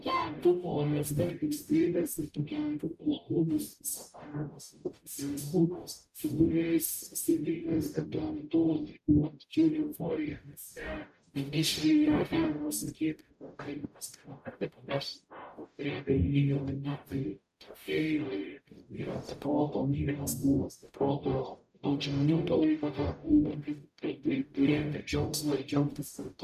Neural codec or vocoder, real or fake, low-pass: codec, 44.1 kHz, 0.9 kbps, DAC; fake; 14.4 kHz